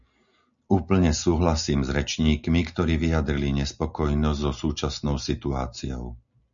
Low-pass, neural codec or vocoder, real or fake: 7.2 kHz; none; real